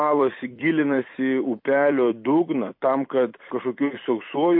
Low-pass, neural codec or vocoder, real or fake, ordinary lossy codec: 5.4 kHz; vocoder, 44.1 kHz, 128 mel bands every 256 samples, BigVGAN v2; fake; MP3, 32 kbps